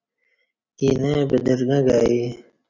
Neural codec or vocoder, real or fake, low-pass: none; real; 7.2 kHz